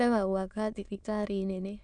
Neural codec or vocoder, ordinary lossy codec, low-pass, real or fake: autoencoder, 22.05 kHz, a latent of 192 numbers a frame, VITS, trained on many speakers; none; 9.9 kHz; fake